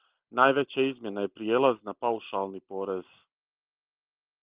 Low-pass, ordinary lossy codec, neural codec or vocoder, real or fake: 3.6 kHz; Opus, 24 kbps; none; real